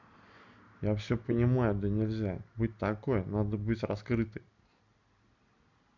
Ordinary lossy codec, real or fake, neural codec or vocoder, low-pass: none; real; none; 7.2 kHz